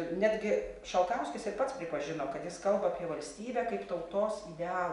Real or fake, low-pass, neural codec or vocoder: real; 10.8 kHz; none